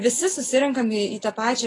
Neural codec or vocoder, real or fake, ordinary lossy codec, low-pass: none; real; AAC, 32 kbps; 10.8 kHz